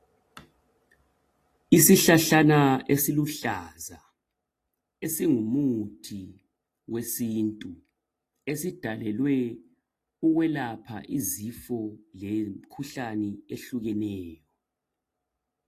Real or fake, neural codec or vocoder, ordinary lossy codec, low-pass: real; none; AAC, 48 kbps; 14.4 kHz